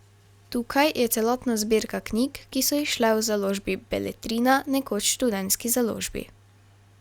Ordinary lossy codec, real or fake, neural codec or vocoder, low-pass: Opus, 64 kbps; real; none; 19.8 kHz